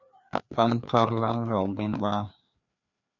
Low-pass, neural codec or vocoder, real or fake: 7.2 kHz; codec, 16 kHz, 2 kbps, FreqCodec, larger model; fake